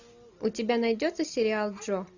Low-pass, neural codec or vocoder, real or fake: 7.2 kHz; none; real